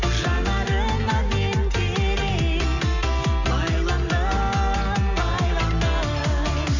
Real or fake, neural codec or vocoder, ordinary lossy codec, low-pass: real; none; none; 7.2 kHz